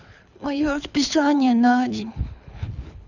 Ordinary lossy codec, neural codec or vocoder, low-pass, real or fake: none; codec, 24 kHz, 6 kbps, HILCodec; 7.2 kHz; fake